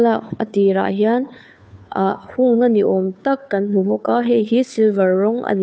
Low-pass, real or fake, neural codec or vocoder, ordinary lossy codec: none; fake; codec, 16 kHz, 2 kbps, FunCodec, trained on Chinese and English, 25 frames a second; none